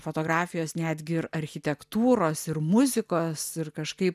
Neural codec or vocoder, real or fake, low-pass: none; real; 14.4 kHz